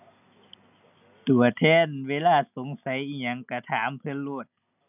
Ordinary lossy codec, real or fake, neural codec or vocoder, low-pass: none; real; none; 3.6 kHz